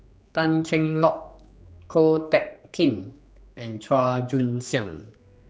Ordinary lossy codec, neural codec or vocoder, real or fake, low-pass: none; codec, 16 kHz, 2 kbps, X-Codec, HuBERT features, trained on general audio; fake; none